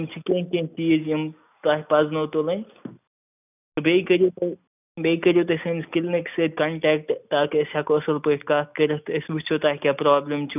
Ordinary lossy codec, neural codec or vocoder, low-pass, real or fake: none; none; 3.6 kHz; real